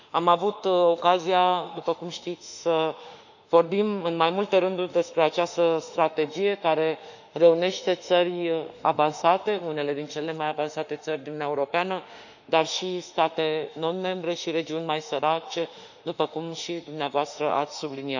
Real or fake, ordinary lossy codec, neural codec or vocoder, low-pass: fake; none; autoencoder, 48 kHz, 32 numbers a frame, DAC-VAE, trained on Japanese speech; 7.2 kHz